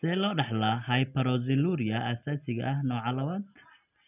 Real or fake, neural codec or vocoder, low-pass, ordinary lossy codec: real; none; 3.6 kHz; none